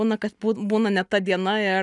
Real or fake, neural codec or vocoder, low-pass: real; none; 10.8 kHz